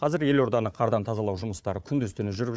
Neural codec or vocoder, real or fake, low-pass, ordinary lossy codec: codec, 16 kHz, 4 kbps, FunCodec, trained on Chinese and English, 50 frames a second; fake; none; none